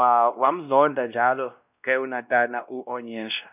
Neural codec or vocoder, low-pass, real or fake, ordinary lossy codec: codec, 16 kHz, 1 kbps, X-Codec, WavLM features, trained on Multilingual LibriSpeech; 3.6 kHz; fake; none